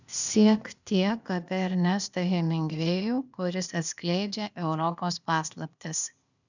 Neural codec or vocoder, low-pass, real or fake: codec, 16 kHz, 0.8 kbps, ZipCodec; 7.2 kHz; fake